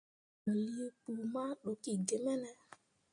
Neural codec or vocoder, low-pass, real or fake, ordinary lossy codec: none; 9.9 kHz; real; MP3, 48 kbps